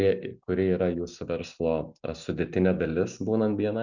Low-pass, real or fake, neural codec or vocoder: 7.2 kHz; real; none